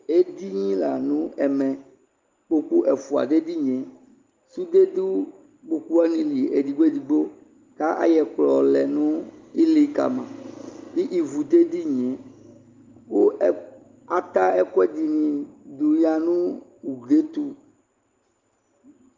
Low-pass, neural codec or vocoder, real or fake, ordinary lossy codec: 7.2 kHz; none; real; Opus, 24 kbps